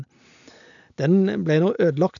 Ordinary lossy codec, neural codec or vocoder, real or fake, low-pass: none; none; real; 7.2 kHz